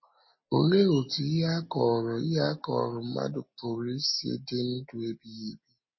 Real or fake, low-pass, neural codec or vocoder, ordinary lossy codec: real; 7.2 kHz; none; MP3, 24 kbps